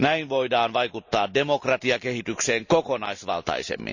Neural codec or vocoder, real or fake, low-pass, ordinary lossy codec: none; real; 7.2 kHz; none